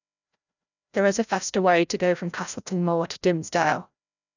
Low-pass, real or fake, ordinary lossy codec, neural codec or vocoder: 7.2 kHz; fake; none; codec, 16 kHz, 0.5 kbps, FreqCodec, larger model